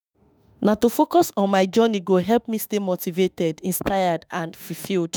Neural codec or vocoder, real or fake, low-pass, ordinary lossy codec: autoencoder, 48 kHz, 32 numbers a frame, DAC-VAE, trained on Japanese speech; fake; none; none